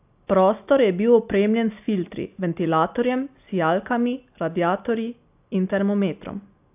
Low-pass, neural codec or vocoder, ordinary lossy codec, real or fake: 3.6 kHz; none; AAC, 32 kbps; real